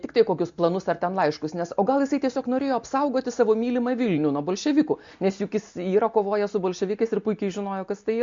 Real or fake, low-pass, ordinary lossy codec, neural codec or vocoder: real; 7.2 kHz; MP3, 48 kbps; none